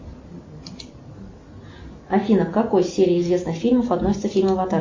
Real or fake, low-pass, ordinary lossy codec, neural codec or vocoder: real; 7.2 kHz; MP3, 32 kbps; none